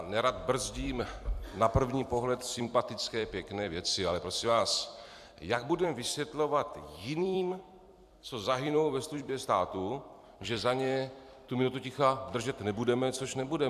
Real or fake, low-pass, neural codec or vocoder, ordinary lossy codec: fake; 14.4 kHz; vocoder, 44.1 kHz, 128 mel bands every 256 samples, BigVGAN v2; AAC, 96 kbps